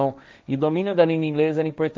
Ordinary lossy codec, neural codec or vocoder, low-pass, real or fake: none; codec, 16 kHz, 1.1 kbps, Voila-Tokenizer; none; fake